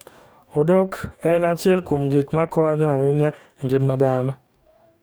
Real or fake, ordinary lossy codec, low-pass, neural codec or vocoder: fake; none; none; codec, 44.1 kHz, 2.6 kbps, DAC